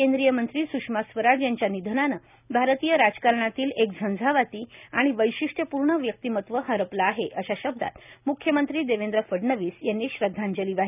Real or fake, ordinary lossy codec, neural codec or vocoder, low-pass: real; none; none; 3.6 kHz